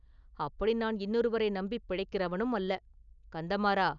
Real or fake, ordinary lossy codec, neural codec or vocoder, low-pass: real; none; none; 7.2 kHz